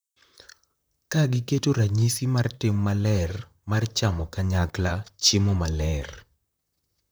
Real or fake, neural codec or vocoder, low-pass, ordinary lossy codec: fake; vocoder, 44.1 kHz, 128 mel bands, Pupu-Vocoder; none; none